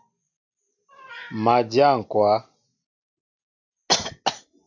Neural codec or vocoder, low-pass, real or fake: none; 7.2 kHz; real